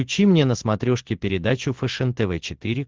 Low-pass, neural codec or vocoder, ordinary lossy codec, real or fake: 7.2 kHz; none; Opus, 16 kbps; real